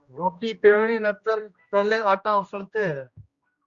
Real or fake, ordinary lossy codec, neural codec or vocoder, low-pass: fake; Opus, 64 kbps; codec, 16 kHz, 1 kbps, X-Codec, HuBERT features, trained on general audio; 7.2 kHz